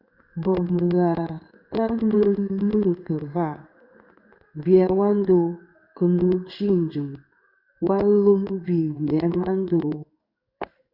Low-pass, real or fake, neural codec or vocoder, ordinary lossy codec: 5.4 kHz; fake; codec, 16 kHz in and 24 kHz out, 1 kbps, XY-Tokenizer; Opus, 64 kbps